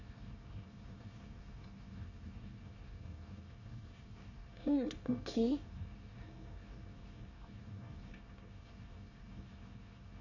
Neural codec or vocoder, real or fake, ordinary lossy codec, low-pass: codec, 24 kHz, 1 kbps, SNAC; fake; none; 7.2 kHz